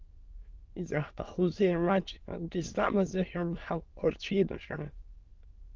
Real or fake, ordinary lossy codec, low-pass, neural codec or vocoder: fake; Opus, 32 kbps; 7.2 kHz; autoencoder, 22.05 kHz, a latent of 192 numbers a frame, VITS, trained on many speakers